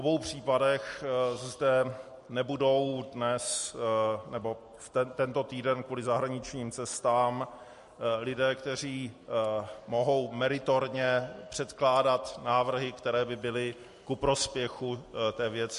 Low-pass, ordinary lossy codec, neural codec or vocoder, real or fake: 14.4 kHz; MP3, 48 kbps; none; real